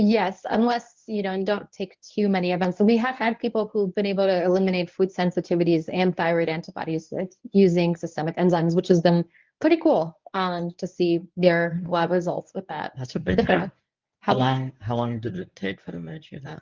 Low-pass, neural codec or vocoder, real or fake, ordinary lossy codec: 7.2 kHz; codec, 24 kHz, 0.9 kbps, WavTokenizer, medium speech release version 1; fake; Opus, 32 kbps